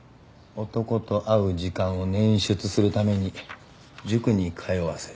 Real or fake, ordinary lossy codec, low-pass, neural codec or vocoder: real; none; none; none